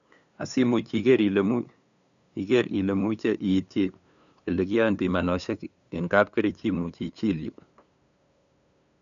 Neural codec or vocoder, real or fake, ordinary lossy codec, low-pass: codec, 16 kHz, 2 kbps, FunCodec, trained on LibriTTS, 25 frames a second; fake; none; 7.2 kHz